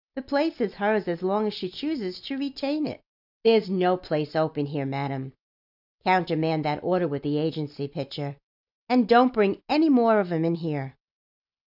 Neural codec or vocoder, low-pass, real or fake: none; 5.4 kHz; real